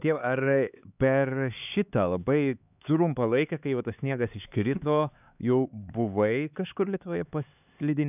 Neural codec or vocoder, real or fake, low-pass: codec, 16 kHz, 4 kbps, X-Codec, HuBERT features, trained on LibriSpeech; fake; 3.6 kHz